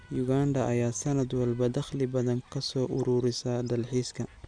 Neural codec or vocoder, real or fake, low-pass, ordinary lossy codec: none; real; 9.9 kHz; none